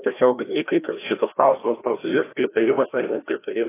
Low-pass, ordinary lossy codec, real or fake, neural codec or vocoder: 3.6 kHz; AAC, 16 kbps; fake; codec, 16 kHz, 1 kbps, FreqCodec, larger model